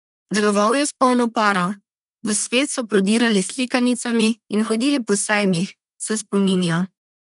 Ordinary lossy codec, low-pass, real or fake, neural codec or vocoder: none; 10.8 kHz; fake; codec, 24 kHz, 1 kbps, SNAC